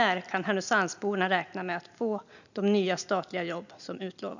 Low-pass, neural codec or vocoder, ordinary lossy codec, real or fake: 7.2 kHz; none; none; real